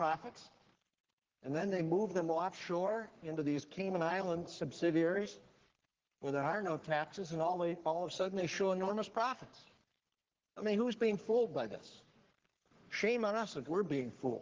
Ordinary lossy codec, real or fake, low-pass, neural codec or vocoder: Opus, 16 kbps; fake; 7.2 kHz; codec, 44.1 kHz, 3.4 kbps, Pupu-Codec